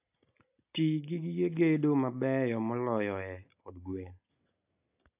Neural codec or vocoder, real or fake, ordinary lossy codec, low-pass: none; real; AAC, 32 kbps; 3.6 kHz